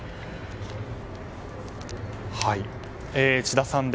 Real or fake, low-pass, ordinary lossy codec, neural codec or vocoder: real; none; none; none